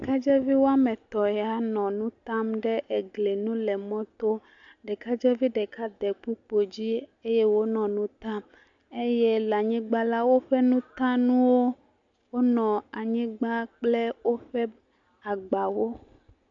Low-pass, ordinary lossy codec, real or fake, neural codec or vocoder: 7.2 kHz; AAC, 64 kbps; real; none